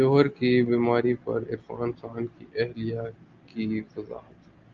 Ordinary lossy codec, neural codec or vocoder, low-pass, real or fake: Opus, 32 kbps; none; 7.2 kHz; real